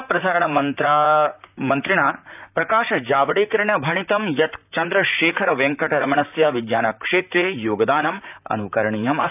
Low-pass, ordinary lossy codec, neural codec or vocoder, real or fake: 3.6 kHz; none; vocoder, 44.1 kHz, 128 mel bands, Pupu-Vocoder; fake